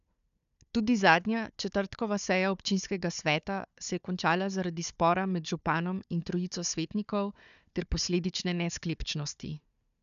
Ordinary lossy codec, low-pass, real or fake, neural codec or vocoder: none; 7.2 kHz; fake; codec, 16 kHz, 4 kbps, FunCodec, trained on Chinese and English, 50 frames a second